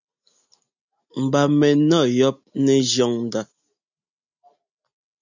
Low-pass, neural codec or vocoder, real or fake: 7.2 kHz; none; real